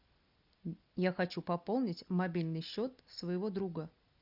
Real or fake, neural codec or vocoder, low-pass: real; none; 5.4 kHz